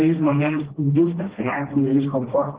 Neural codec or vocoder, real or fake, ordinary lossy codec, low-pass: codec, 16 kHz, 1 kbps, FreqCodec, smaller model; fake; Opus, 16 kbps; 3.6 kHz